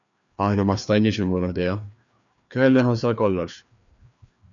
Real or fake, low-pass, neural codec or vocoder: fake; 7.2 kHz; codec, 16 kHz, 2 kbps, FreqCodec, larger model